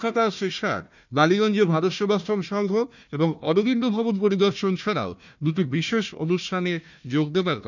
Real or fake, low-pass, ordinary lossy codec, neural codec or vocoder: fake; 7.2 kHz; none; codec, 16 kHz, 1 kbps, FunCodec, trained on Chinese and English, 50 frames a second